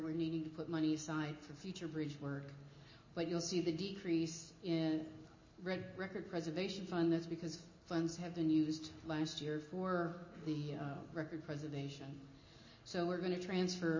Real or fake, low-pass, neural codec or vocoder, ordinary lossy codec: real; 7.2 kHz; none; MP3, 32 kbps